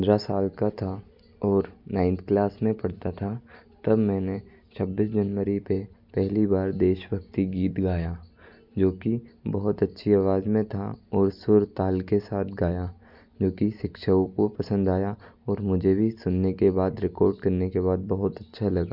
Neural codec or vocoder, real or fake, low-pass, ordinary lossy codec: none; real; 5.4 kHz; none